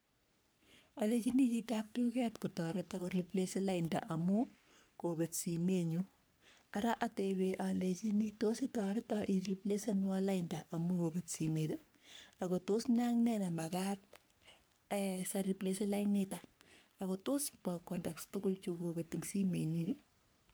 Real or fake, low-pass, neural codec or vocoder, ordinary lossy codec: fake; none; codec, 44.1 kHz, 3.4 kbps, Pupu-Codec; none